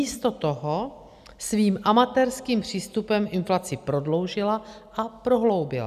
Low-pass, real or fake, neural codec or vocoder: 14.4 kHz; real; none